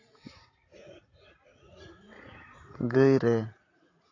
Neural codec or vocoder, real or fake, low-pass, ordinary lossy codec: vocoder, 44.1 kHz, 80 mel bands, Vocos; fake; 7.2 kHz; AAC, 32 kbps